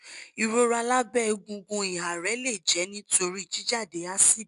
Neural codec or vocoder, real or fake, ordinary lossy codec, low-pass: none; real; none; 10.8 kHz